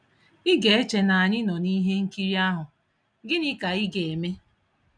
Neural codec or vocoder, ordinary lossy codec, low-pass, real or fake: none; none; 9.9 kHz; real